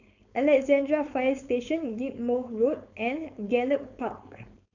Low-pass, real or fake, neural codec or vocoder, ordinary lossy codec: 7.2 kHz; fake; codec, 16 kHz, 4.8 kbps, FACodec; none